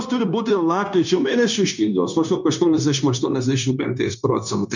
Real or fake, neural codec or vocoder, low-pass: fake; codec, 16 kHz, 0.9 kbps, LongCat-Audio-Codec; 7.2 kHz